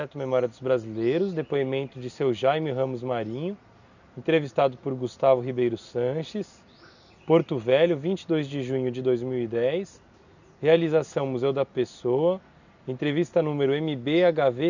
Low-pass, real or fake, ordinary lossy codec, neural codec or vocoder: 7.2 kHz; real; none; none